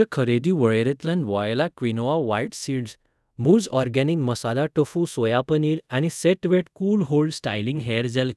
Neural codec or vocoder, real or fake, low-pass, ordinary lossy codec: codec, 24 kHz, 0.5 kbps, DualCodec; fake; none; none